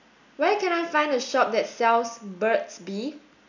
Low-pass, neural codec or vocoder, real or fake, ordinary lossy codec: 7.2 kHz; none; real; none